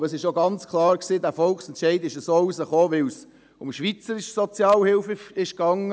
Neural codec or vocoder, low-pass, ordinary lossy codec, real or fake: none; none; none; real